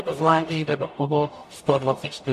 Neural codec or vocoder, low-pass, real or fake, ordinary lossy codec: codec, 44.1 kHz, 0.9 kbps, DAC; 14.4 kHz; fake; AAC, 64 kbps